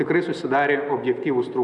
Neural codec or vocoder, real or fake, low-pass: none; real; 10.8 kHz